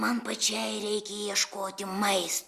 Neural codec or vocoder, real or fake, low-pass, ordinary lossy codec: none; real; 14.4 kHz; Opus, 64 kbps